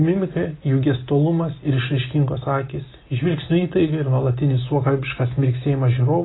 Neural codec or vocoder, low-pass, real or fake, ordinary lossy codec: none; 7.2 kHz; real; AAC, 16 kbps